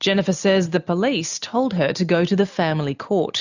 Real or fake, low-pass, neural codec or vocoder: real; 7.2 kHz; none